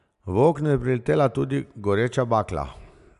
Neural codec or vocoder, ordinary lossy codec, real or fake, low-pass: none; none; real; 10.8 kHz